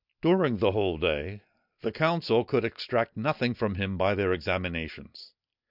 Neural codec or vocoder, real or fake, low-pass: none; real; 5.4 kHz